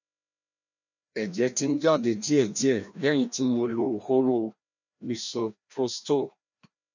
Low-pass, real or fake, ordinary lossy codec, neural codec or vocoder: 7.2 kHz; fake; none; codec, 16 kHz, 1 kbps, FreqCodec, larger model